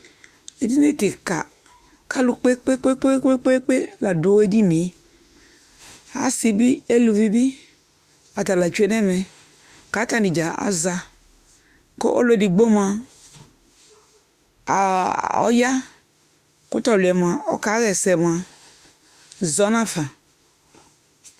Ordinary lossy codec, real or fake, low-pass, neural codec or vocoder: Opus, 64 kbps; fake; 14.4 kHz; autoencoder, 48 kHz, 32 numbers a frame, DAC-VAE, trained on Japanese speech